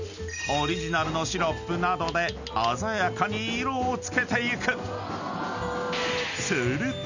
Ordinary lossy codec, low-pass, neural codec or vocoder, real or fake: none; 7.2 kHz; none; real